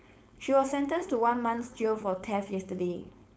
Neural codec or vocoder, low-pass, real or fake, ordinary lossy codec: codec, 16 kHz, 4.8 kbps, FACodec; none; fake; none